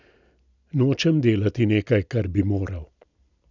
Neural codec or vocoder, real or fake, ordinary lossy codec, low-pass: none; real; none; 7.2 kHz